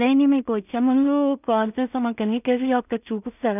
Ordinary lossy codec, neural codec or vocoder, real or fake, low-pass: none; codec, 16 kHz in and 24 kHz out, 0.4 kbps, LongCat-Audio-Codec, two codebook decoder; fake; 3.6 kHz